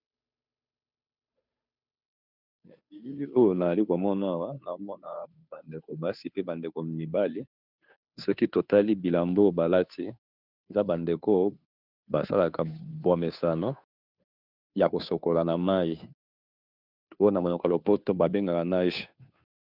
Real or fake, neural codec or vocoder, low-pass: fake; codec, 16 kHz, 2 kbps, FunCodec, trained on Chinese and English, 25 frames a second; 5.4 kHz